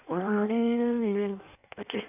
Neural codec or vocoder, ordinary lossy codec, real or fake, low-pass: codec, 16 kHz in and 24 kHz out, 1.1 kbps, FireRedTTS-2 codec; none; fake; 3.6 kHz